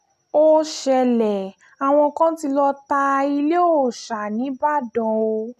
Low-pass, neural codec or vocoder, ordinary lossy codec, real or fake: 14.4 kHz; none; none; real